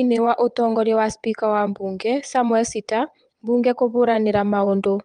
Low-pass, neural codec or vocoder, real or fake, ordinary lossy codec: 9.9 kHz; vocoder, 22.05 kHz, 80 mel bands, WaveNeXt; fake; Opus, 32 kbps